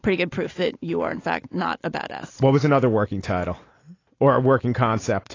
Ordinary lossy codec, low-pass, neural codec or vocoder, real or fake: AAC, 32 kbps; 7.2 kHz; none; real